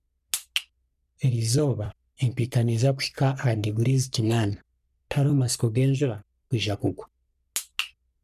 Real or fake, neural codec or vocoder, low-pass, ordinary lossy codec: fake; codec, 44.1 kHz, 3.4 kbps, Pupu-Codec; 14.4 kHz; none